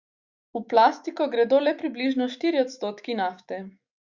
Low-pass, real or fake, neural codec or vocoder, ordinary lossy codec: 7.2 kHz; fake; autoencoder, 48 kHz, 128 numbers a frame, DAC-VAE, trained on Japanese speech; Opus, 64 kbps